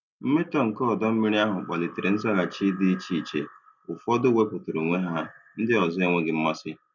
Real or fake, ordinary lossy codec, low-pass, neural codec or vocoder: real; none; 7.2 kHz; none